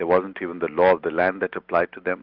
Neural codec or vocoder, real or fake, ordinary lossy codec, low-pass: none; real; Opus, 32 kbps; 5.4 kHz